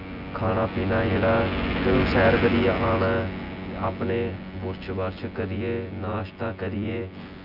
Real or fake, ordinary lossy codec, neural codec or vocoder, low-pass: fake; none; vocoder, 24 kHz, 100 mel bands, Vocos; 5.4 kHz